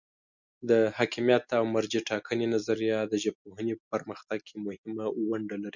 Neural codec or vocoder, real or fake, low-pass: none; real; 7.2 kHz